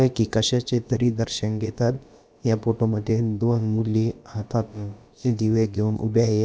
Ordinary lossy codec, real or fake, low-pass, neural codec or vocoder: none; fake; none; codec, 16 kHz, about 1 kbps, DyCAST, with the encoder's durations